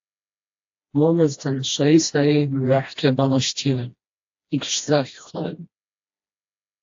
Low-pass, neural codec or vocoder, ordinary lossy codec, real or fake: 7.2 kHz; codec, 16 kHz, 1 kbps, FreqCodec, smaller model; AAC, 48 kbps; fake